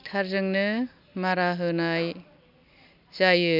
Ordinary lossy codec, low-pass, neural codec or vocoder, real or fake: none; 5.4 kHz; none; real